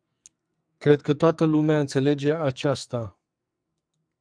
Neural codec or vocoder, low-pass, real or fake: codec, 44.1 kHz, 2.6 kbps, SNAC; 9.9 kHz; fake